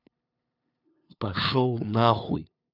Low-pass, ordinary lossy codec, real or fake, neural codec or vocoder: 5.4 kHz; none; fake; codec, 16 kHz, 2 kbps, FunCodec, trained on LibriTTS, 25 frames a second